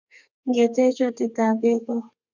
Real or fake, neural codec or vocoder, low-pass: fake; codec, 32 kHz, 1.9 kbps, SNAC; 7.2 kHz